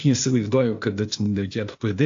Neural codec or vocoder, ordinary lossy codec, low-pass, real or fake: codec, 16 kHz, 0.8 kbps, ZipCodec; AAC, 48 kbps; 7.2 kHz; fake